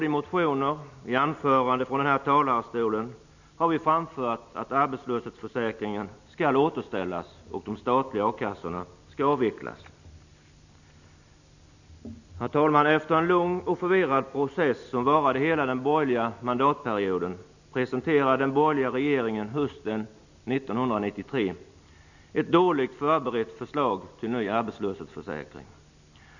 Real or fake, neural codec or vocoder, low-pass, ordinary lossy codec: real; none; 7.2 kHz; none